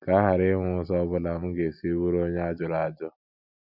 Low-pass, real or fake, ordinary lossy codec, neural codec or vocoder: 5.4 kHz; real; none; none